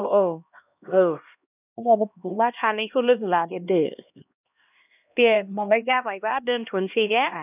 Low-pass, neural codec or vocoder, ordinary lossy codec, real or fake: 3.6 kHz; codec, 16 kHz, 1 kbps, X-Codec, HuBERT features, trained on LibriSpeech; none; fake